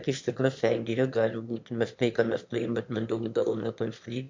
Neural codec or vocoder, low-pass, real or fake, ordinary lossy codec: autoencoder, 22.05 kHz, a latent of 192 numbers a frame, VITS, trained on one speaker; 7.2 kHz; fake; MP3, 48 kbps